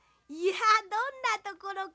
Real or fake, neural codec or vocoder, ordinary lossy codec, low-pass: real; none; none; none